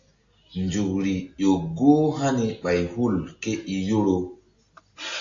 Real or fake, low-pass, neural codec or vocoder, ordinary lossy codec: real; 7.2 kHz; none; AAC, 48 kbps